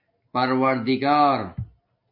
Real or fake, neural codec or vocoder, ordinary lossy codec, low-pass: real; none; MP3, 32 kbps; 5.4 kHz